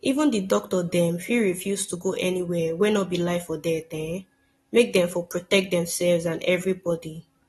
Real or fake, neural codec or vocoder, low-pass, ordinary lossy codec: real; none; 14.4 kHz; AAC, 32 kbps